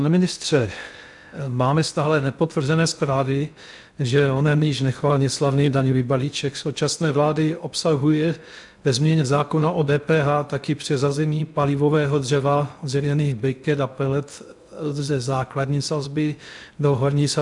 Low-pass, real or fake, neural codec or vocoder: 10.8 kHz; fake; codec, 16 kHz in and 24 kHz out, 0.6 kbps, FocalCodec, streaming, 2048 codes